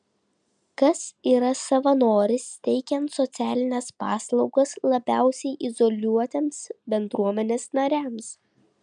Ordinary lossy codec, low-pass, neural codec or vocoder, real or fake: MP3, 96 kbps; 9.9 kHz; none; real